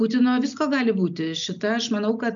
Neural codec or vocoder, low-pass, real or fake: none; 7.2 kHz; real